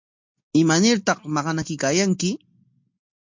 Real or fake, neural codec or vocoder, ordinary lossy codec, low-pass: real; none; MP3, 48 kbps; 7.2 kHz